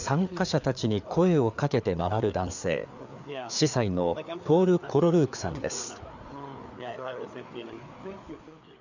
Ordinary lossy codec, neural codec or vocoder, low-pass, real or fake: none; codec, 16 kHz, 4 kbps, FreqCodec, larger model; 7.2 kHz; fake